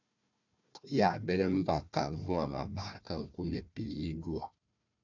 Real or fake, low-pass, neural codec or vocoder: fake; 7.2 kHz; codec, 16 kHz, 1 kbps, FunCodec, trained on Chinese and English, 50 frames a second